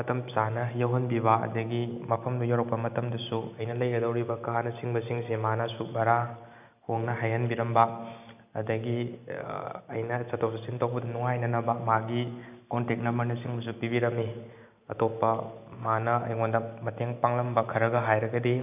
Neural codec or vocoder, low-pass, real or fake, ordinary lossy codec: none; 3.6 kHz; real; none